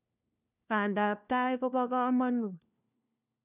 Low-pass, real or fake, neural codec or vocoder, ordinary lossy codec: 3.6 kHz; fake; codec, 16 kHz, 1 kbps, FunCodec, trained on LibriTTS, 50 frames a second; none